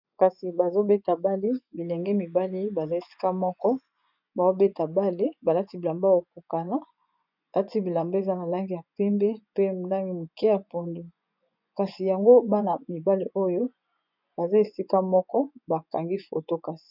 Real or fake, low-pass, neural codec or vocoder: fake; 5.4 kHz; vocoder, 24 kHz, 100 mel bands, Vocos